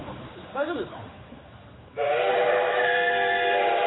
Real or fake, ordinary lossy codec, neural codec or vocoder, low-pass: fake; AAC, 16 kbps; codec, 16 kHz, 4 kbps, X-Codec, HuBERT features, trained on general audio; 7.2 kHz